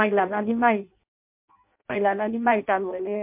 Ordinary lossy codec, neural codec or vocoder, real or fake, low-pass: MP3, 32 kbps; codec, 16 kHz in and 24 kHz out, 0.6 kbps, FireRedTTS-2 codec; fake; 3.6 kHz